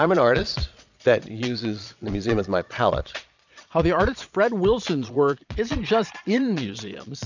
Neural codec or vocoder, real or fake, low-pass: vocoder, 22.05 kHz, 80 mel bands, Vocos; fake; 7.2 kHz